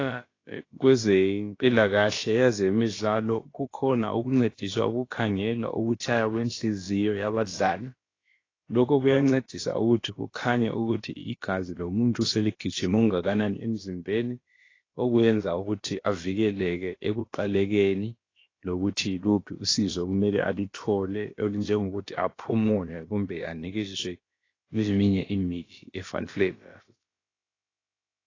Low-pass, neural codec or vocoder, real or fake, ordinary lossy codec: 7.2 kHz; codec, 16 kHz, about 1 kbps, DyCAST, with the encoder's durations; fake; AAC, 32 kbps